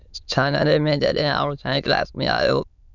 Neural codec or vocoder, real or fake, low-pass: autoencoder, 22.05 kHz, a latent of 192 numbers a frame, VITS, trained on many speakers; fake; 7.2 kHz